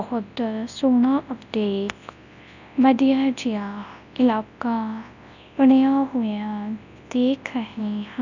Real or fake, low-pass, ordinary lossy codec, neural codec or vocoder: fake; 7.2 kHz; none; codec, 24 kHz, 0.9 kbps, WavTokenizer, large speech release